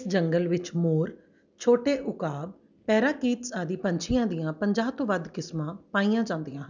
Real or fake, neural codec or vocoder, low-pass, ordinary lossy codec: real; none; 7.2 kHz; none